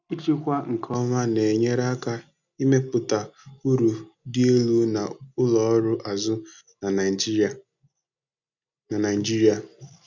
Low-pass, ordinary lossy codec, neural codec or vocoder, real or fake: 7.2 kHz; none; none; real